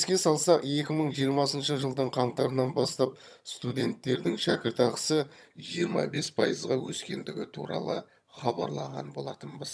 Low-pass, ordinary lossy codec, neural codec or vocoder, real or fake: none; none; vocoder, 22.05 kHz, 80 mel bands, HiFi-GAN; fake